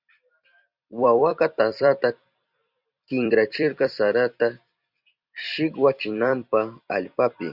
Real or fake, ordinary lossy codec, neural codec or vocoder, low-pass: fake; Opus, 64 kbps; vocoder, 44.1 kHz, 128 mel bands every 256 samples, BigVGAN v2; 5.4 kHz